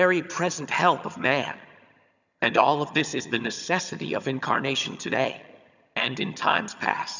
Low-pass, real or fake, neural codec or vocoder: 7.2 kHz; fake; vocoder, 22.05 kHz, 80 mel bands, HiFi-GAN